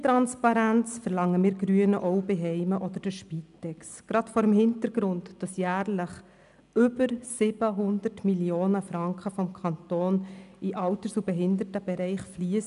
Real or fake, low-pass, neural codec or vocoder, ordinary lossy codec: real; 10.8 kHz; none; none